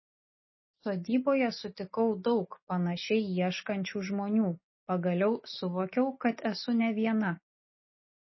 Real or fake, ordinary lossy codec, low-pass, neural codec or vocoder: real; MP3, 24 kbps; 7.2 kHz; none